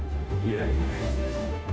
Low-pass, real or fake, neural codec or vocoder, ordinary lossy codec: none; fake; codec, 16 kHz, 0.5 kbps, FunCodec, trained on Chinese and English, 25 frames a second; none